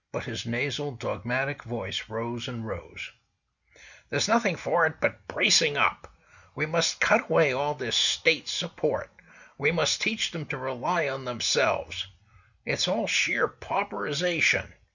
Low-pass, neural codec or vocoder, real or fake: 7.2 kHz; none; real